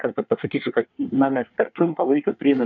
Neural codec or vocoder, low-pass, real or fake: codec, 24 kHz, 1 kbps, SNAC; 7.2 kHz; fake